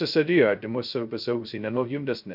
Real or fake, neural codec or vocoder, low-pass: fake; codec, 16 kHz, 0.2 kbps, FocalCodec; 5.4 kHz